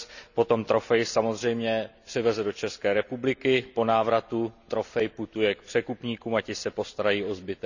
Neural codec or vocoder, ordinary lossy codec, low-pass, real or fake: none; none; 7.2 kHz; real